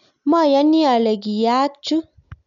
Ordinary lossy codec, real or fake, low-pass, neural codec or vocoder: none; real; 7.2 kHz; none